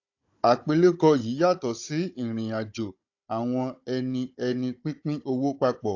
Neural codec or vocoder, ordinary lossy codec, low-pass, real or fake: codec, 16 kHz, 16 kbps, FunCodec, trained on Chinese and English, 50 frames a second; AAC, 48 kbps; 7.2 kHz; fake